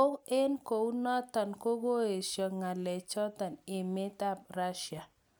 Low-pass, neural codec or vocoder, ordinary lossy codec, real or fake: none; none; none; real